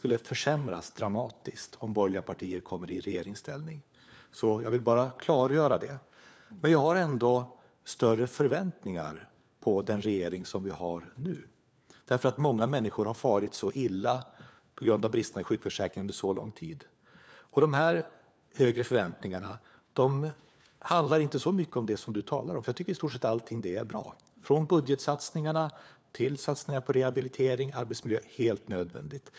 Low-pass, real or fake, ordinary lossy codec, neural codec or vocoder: none; fake; none; codec, 16 kHz, 4 kbps, FunCodec, trained on LibriTTS, 50 frames a second